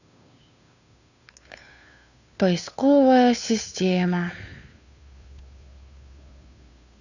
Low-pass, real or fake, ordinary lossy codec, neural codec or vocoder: 7.2 kHz; fake; none; codec, 16 kHz, 2 kbps, FunCodec, trained on Chinese and English, 25 frames a second